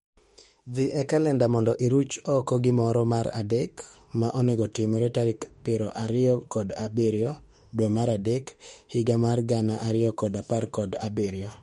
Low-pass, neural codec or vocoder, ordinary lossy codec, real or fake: 19.8 kHz; autoencoder, 48 kHz, 32 numbers a frame, DAC-VAE, trained on Japanese speech; MP3, 48 kbps; fake